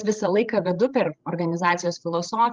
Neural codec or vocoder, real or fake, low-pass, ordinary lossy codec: codec, 16 kHz, 16 kbps, FreqCodec, larger model; fake; 7.2 kHz; Opus, 24 kbps